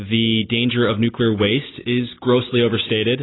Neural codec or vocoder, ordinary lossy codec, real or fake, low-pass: none; AAC, 16 kbps; real; 7.2 kHz